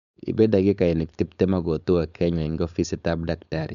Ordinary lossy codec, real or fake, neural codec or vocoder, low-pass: none; fake; codec, 16 kHz, 4.8 kbps, FACodec; 7.2 kHz